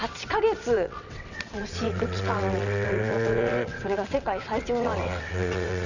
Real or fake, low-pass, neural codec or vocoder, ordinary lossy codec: fake; 7.2 kHz; vocoder, 22.05 kHz, 80 mel bands, WaveNeXt; none